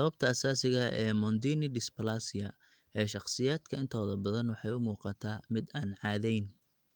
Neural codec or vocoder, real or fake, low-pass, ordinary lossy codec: autoencoder, 48 kHz, 128 numbers a frame, DAC-VAE, trained on Japanese speech; fake; 19.8 kHz; Opus, 24 kbps